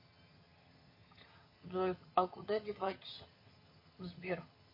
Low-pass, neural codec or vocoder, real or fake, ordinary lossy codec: 5.4 kHz; vocoder, 22.05 kHz, 80 mel bands, HiFi-GAN; fake; MP3, 24 kbps